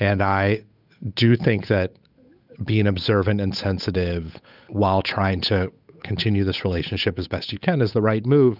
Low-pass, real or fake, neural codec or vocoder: 5.4 kHz; fake; codec, 16 kHz, 8 kbps, FunCodec, trained on Chinese and English, 25 frames a second